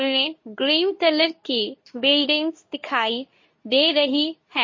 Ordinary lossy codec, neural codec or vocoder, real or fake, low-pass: MP3, 32 kbps; codec, 24 kHz, 0.9 kbps, WavTokenizer, medium speech release version 2; fake; 7.2 kHz